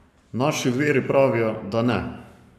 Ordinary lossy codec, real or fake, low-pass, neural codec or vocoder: AAC, 96 kbps; fake; 14.4 kHz; codec, 44.1 kHz, 7.8 kbps, Pupu-Codec